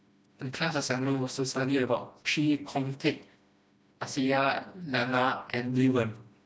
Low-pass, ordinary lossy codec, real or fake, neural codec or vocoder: none; none; fake; codec, 16 kHz, 1 kbps, FreqCodec, smaller model